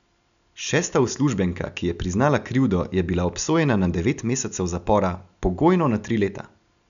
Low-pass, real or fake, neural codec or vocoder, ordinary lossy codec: 7.2 kHz; real; none; none